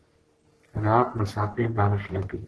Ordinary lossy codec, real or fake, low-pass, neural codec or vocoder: Opus, 16 kbps; fake; 10.8 kHz; codec, 44.1 kHz, 3.4 kbps, Pupu-Codec